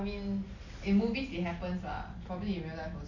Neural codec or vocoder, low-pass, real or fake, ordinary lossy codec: none; 7.2 kHz; real; none